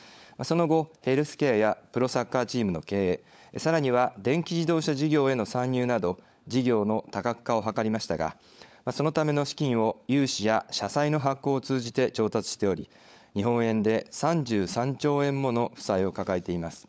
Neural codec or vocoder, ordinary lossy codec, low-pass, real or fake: codec, 16 kHz, 16 kbps, FunCodec, trained on LibriTTS, 50 frames a second; none; none; fake